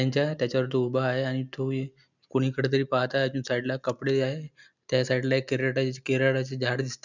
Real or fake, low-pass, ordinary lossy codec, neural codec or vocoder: real; 7.2 kHz; none; none